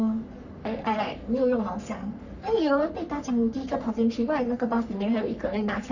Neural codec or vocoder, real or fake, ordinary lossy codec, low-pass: codec, 44.1 kHz, 3.4 kbps, Pupu-Codec; fake; none; 7.2 kHz